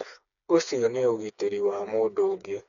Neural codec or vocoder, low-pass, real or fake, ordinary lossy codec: codec, 16 kHz, 4 kbps, FreqCodec, smaller model; 7.2 kHz; fake; none